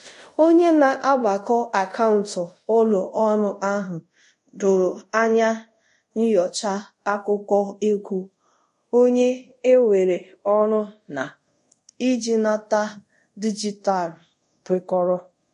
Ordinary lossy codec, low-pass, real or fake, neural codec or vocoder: MP3, 48 kbps; 10.8 kHz; fake; codec, 24 kHz, 0.5 kbps, DualCodec